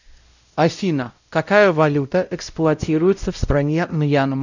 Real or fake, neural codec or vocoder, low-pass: fake; codec, 16 kHz, 0.5 kbps, X-Codec, WavLM features, trained on Multilingual LibriSpeech; 7.2 kHz